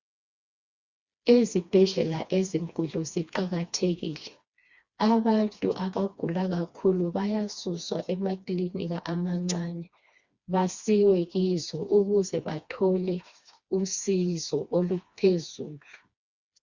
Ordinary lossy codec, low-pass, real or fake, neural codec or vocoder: Opus, 64 kbps; 7.2 kHz; fake; codec, 16 kHz, 2 kbps, FreqCodec, smaller model